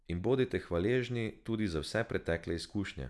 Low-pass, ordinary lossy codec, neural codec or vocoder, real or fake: none; none; none; real